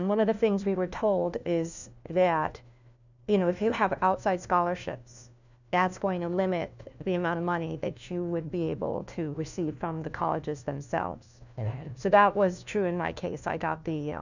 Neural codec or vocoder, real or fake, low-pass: codec, 16 kHz, 1 kbps, FunCodec, trained on LibriTTS, 50 frames a second; fake; 7.2 kHz